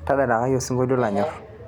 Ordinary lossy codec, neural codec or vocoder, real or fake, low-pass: none; none; real; 19.8 kHz